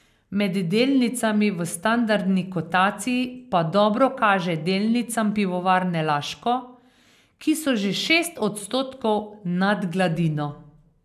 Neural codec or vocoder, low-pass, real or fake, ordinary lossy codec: none; 14.4 kHz; real; none